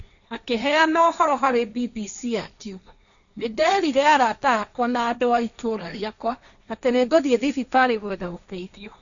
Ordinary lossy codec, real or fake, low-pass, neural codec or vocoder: none; fake; 7.2 kHz; codec, 16 kHz, 1.1 kbps, Voila-Tokenizer